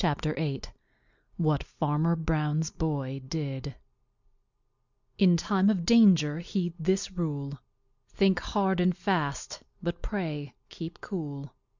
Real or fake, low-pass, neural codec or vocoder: real; 7.2 kHz; none